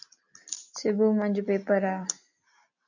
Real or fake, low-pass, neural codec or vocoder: real; 7.2 kHz; none